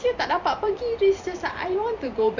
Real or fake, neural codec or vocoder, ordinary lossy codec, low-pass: fake; vocoder, 44.1 kHz, 128 mel bands every 512 samples, BigVGAN v2; none; 7.2 kHz